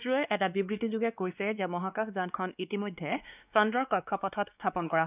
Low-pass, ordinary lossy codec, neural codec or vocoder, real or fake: 3.6 kHz; none; codec, 16 kHz, 2 kbps, X-Codec, WavLM features, trained on Multilingual LibriSpeech; fake